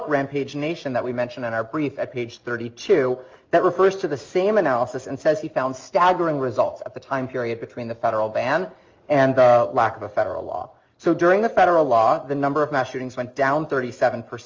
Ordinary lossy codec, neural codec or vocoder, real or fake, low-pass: Opus, 32 kbps; none; real; 7.2 kHz